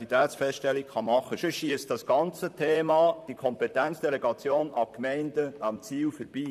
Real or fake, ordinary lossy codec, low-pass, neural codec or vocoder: fake; none; 14.4 kHz; vocoder, 44.1 kHz, 128 mel bands, Pupu-Vocoder